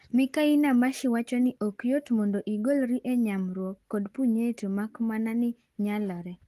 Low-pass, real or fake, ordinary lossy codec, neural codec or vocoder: 14.4 kHz; real; Opus, 24 kbps; none